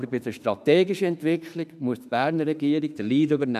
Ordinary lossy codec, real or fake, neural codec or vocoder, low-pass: none; fake; autoencoder, 48 kHz, 32 numbers a frame, DAC-VAE, trained on Japanese speech; 14.4 kHz